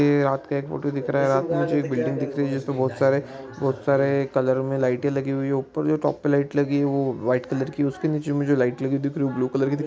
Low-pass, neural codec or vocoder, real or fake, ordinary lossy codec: none; none; real; none